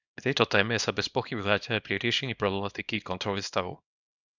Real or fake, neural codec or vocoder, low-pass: fake; codec, 24 kHz, 0.9 kbps, WavTokenizer, small release; 7.2 kHz